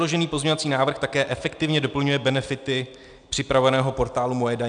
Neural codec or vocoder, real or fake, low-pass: none; real; 9.9 kHz